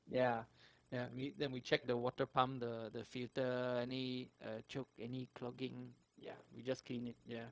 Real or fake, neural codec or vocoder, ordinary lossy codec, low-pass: fake; codec, 16 kHz, 0.4 kbps, LongCat-Audio-Codec; none; none